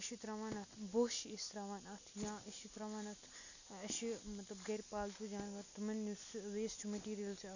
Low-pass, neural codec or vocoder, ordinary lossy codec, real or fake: 7.2 kHz; none; none; real